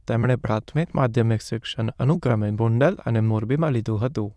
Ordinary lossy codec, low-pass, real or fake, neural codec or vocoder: none; none; fake; autoencoder, 22.05 kHz, a latent of 192 numbers a frame, VITS, trained on many speakers